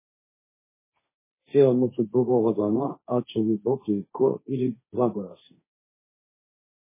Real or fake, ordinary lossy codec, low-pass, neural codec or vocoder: fake; MP3, 16 kbps; 3.6 kHz; codec, 16 kHz, 1.1 kbps, Voila-Tokenizer